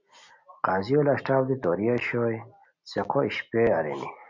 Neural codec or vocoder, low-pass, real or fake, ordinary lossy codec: none; 7.2 kHz; real; MP3, 48 kbps